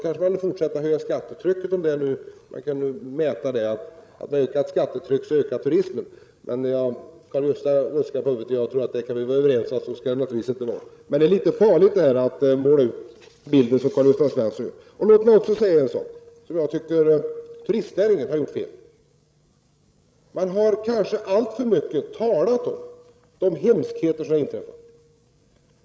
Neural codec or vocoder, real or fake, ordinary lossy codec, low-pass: codec, 16 kHz, 16 kbps, FreqCodec, larger model; fake; none; none